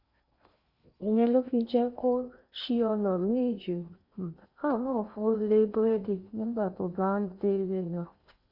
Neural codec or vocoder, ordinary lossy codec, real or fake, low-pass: codec, 16 kHz in and 24 kHz out, 0.8 kbps, FocalCodec, streaming, 65536 codes; Opus, 64 kbps; fake; 5.4 kHz